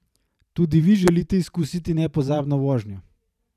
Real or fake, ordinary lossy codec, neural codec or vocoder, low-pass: fake; none; vocoder, 44.1 kHz, 128 mel bands every 512 samples, BigVGAN v2; 14.4 kHz